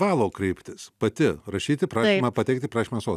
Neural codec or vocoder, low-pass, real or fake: none; 14.4 kHz; real